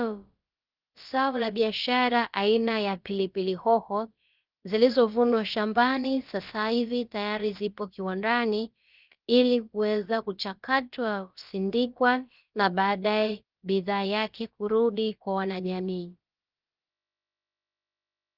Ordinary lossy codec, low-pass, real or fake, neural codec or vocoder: Opus, 24 kbps; 5.4 kHz; fake; codec, 16 kHz, about 1 kbps, DyCAST, with the encoder's durations